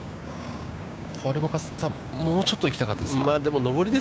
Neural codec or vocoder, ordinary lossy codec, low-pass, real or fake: codec, 16 kHz, 6 kbps, DAC; none; none; fake